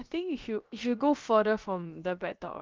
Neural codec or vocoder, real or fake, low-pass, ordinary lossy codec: codec, 16 kHz, about 1 kbps, DyCAST, with the encoder's durations; fake; 7.2 kHz; Opus, 32 kbps